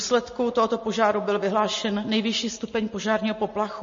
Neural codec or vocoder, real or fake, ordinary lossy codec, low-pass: none; real; MP3, 32 kbps; 7.2 kHz